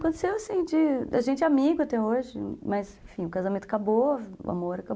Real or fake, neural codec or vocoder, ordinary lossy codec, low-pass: real; none; none; none